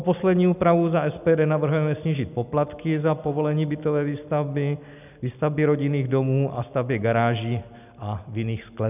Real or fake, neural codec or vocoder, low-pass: real; none; 3.6 kHz